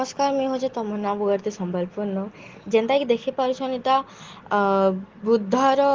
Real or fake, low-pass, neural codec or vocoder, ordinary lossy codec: real; 7.2 kHz; none; Opus, 16 kbps